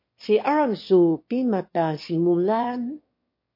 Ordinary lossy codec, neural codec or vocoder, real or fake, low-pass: MP3, 32 kbps; autoencoder, 22.05 kHz, a latent of 192 numbers a frame, VITS, trained on one speaker; fake; 5.4 kHz